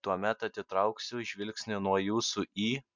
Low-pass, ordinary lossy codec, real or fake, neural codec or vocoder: 7.2 kHz; MP3, 64 kbps; real; none